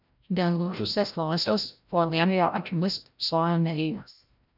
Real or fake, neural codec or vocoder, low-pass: fake; codec, 16 kHz, 0.5 kbps, FreqCodec, larger model; 5.4 kHz